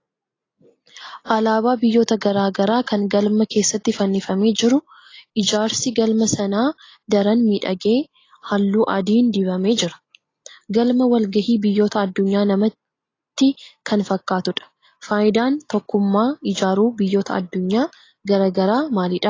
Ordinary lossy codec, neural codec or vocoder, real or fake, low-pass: AAC, 32 kbps; none; real; 7.2 kHz